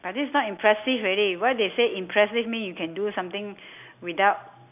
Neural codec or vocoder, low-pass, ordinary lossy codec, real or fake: none; 3.6 kHz; none; real